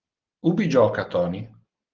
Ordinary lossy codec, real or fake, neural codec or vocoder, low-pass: Opus, 16 kbps; real; none; 7.2 kHz